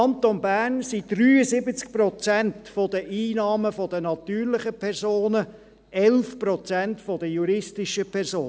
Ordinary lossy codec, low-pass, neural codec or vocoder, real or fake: none; none; none; real